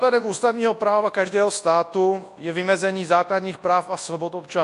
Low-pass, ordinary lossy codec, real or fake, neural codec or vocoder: 10.8 kHz; AAC, 48 kbps; fake; codec, 24 kHz, 0.9 kbps, WavTokenizer, large speech release